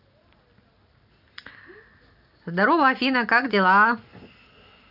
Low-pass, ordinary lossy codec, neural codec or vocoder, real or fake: 5.4 kHz; AAC, 48 kbps; none; real